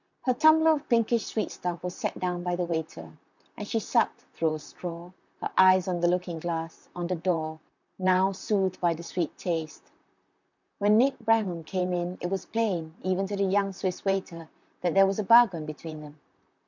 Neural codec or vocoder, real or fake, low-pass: vocoder, 44.1 kHz, 128 mel bands, Pupu-Vocoder; fake; 7.2 kHz